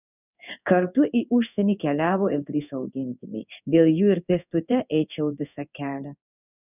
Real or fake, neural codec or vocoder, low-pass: fake; codec, 16 kHz in and 24 kHz out, 1 kbps, XY-Tokenizer; 3.6 kHz